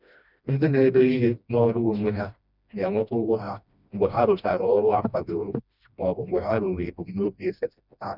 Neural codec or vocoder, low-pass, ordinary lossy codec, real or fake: codec, 16 kHz, 1 kbps, FreqCodec, smaller model; 5.4 kHz; none; fake